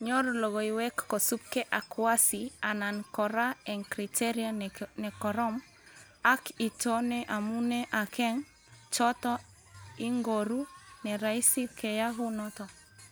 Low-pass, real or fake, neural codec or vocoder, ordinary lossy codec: none; real; none; none